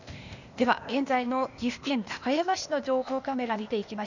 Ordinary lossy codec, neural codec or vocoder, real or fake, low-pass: none; codec, 16 kHz, 0.8 kbps, ZipCodec; fake; 7.2 kHz